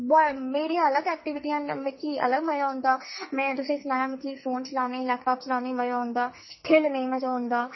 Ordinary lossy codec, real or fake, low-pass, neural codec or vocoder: MP3, 24 kbps; fake; 7.2 kHz; codec, 44.1 kHz, 2.6 kbps, SNAC